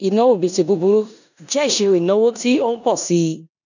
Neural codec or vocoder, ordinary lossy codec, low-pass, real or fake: codec, 16 kHz in and 24 kHz out, 0.9 kbps, LongCat-Audio-Codec, four codebook decoder; none; 7.2 kHz; fake